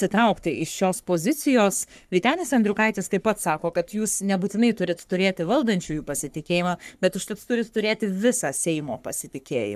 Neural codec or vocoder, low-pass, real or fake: codec, 44.1 kHz, 3.4 kbps, Pupu-Codec; 14.4 kHz; fake